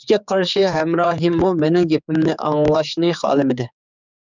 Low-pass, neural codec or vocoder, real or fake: 7.2 kHz; codec, 16 kHz, 4 kbps, X-Codec, HuBERT features, trained on general audio; fake